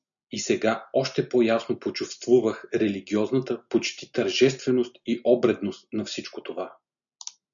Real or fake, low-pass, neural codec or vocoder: real; 7.2 kHz; none